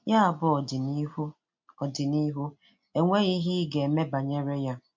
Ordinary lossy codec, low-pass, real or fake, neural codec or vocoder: MP3, 48 kbps; 7.2 kHz; real; none